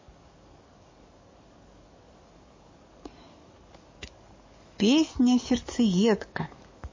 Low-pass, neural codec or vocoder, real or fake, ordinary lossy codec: 7.2 kHz; codec, 44.1 kHz, 7.8 kbps, DAC; fake; MP3, 32 kbps